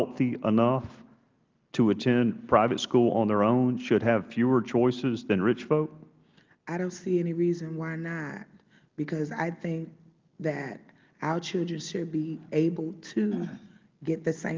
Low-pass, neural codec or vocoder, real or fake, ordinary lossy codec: 7.2 kHz; none; real; Opus, 32 kbps